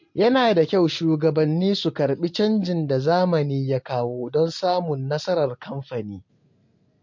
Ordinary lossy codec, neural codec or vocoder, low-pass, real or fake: MP3, 48 kbps; none; 7.2 kHz; real